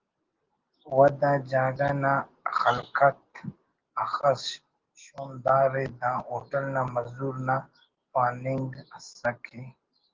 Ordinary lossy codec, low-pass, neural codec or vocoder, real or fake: Opus, 16 kbps; 7.2 kHz; none; real